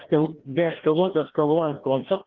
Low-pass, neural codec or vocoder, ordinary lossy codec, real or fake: 7.2 kHz; codec, 16 kHz, 1 kbps, FreqCodec, larger model; Opus, 16 kbps; fake